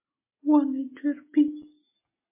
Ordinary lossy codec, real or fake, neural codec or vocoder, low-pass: MP3, 16 kbps; real; none; 3.6 kHz